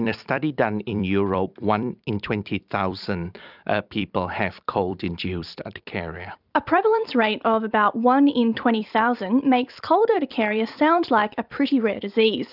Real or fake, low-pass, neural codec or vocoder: fake; 5.4 kHz; vocoder, 44.1 kHz, 128 mel bands every 256 samples, BigVGAN v2